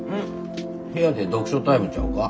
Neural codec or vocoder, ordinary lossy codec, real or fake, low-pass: none; none; real; none